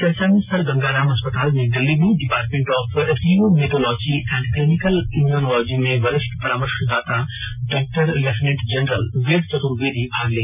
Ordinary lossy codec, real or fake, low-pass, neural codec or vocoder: none; real; 3.6 kHz; none